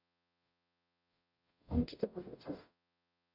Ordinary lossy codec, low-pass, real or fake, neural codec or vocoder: none; 5.4 kHz; fake; codec, 44.1 kHz, 0.9 kbps, DAC